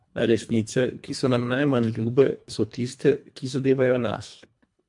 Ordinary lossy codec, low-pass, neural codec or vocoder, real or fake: MP3, 64 kbps; 10.8 kHz; codec, 24 kHz, 1.5 kbps, HILCodec; fake